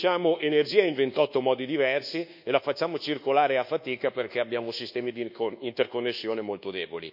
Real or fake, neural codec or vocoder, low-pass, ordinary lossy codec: fake; codec, 24 kHz, 1.2 kbps, DualCodec; 5.4 kHz; none